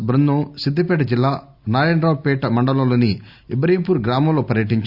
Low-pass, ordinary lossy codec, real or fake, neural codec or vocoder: 5.4 kHz; Opus, 64 kbps; real; none